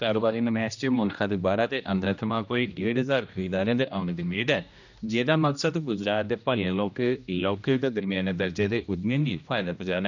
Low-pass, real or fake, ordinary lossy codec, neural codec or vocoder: 7.2 kHz; fake; none; codec, 16 kHz, 1 kbps, X-Codec, HuBERT features, trained on general audio